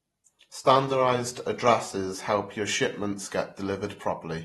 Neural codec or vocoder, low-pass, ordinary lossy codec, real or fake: vocoder, 48 kHz, 128 mel bands, Vocos; 19.8 kHz; AAC, 32 kbps; fake